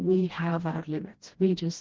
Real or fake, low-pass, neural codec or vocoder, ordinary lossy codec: fake; 7.2 kHz; codec, 16 kHz, 1 kbps, FreqCodec, smaller model; Opus, 16 kbps